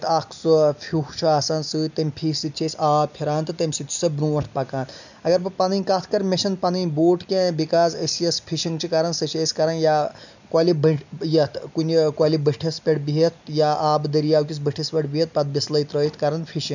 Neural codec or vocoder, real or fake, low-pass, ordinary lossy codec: none; real; 7.2 kHz; none